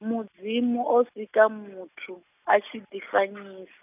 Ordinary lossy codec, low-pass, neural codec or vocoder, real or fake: none; 3.6 kHz; none; real